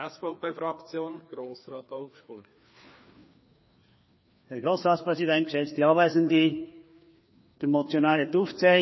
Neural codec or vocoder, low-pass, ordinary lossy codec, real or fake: codec, 16 kHz, 2 kbps, FreqCodec, larger model; 7.2 kHz; MP3, 24 kbps; fake